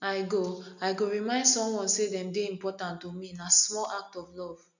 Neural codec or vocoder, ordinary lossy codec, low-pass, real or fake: none; none; 7.2 kHz; real